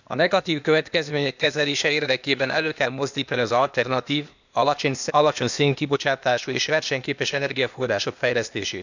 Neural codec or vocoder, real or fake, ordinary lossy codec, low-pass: codec, 16 kHz, 0.8 kbps, ZipCodec; fake; none; 7.2 kHz